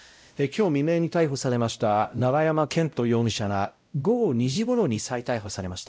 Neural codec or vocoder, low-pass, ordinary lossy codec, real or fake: codec, 16 kHz, 0.5 kbps, X-Codec, WavLM features, trained on Multilingual LibriSpeech; none; none; fake